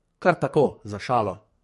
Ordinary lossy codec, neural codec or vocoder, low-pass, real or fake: MP3, 48 kbps; codec, 44.1 kHz, 2.6 kbps, SNAC; 14.4 kHz; fake